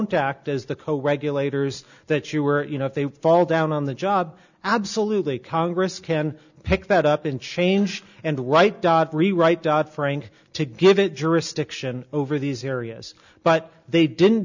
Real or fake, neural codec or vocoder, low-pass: real; none; 7.2 kHz